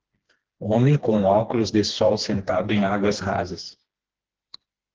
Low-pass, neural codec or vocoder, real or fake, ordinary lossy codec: 7.2 kHz; codec, 16 kHz, 2 kbps, FreqCodec, smaller model; fake; Opus, 16 kbps